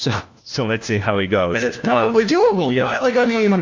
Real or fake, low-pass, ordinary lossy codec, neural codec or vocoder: fake; 7.2 kHz; AAC, 48 kbps; codec, 16 kHz, 1 kbps, FunCodec, trained on LibriTTS, 50 frames a second